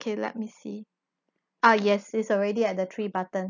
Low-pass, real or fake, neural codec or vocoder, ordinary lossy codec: 7.2 kHz; real; none; none